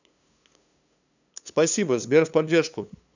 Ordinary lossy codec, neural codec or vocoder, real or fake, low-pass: none; codec, 16 kHz, 2 kbps, FunCodec, trained on LibriTTS, 25 frames a second; fake; 7.2 kHz